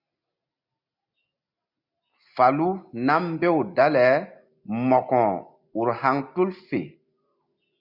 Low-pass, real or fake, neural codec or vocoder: 5.4 kHz; real; none